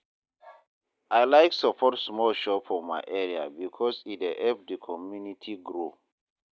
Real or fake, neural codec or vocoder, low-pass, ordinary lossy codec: real; none; none; none